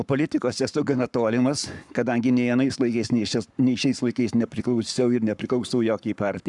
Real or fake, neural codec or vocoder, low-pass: fake; codec, 44.1 kHz, 7.8 kbps, Pupu-Codec; 10.8 kHz